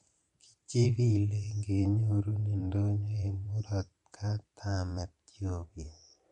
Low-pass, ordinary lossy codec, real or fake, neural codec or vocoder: 19.8 kHz; MP3, 48 kbps; fake; vocoder, 44.1 kHz, 128 mel bands every 512 samples, BigVGAN v2